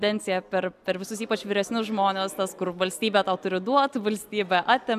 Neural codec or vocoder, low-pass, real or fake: none; 14.4 kHz; real